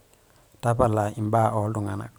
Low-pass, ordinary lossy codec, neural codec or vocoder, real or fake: none; none; vocoder, 44.1 kHz, 128 mel bands every 512 samples, BigVGAN v2; fake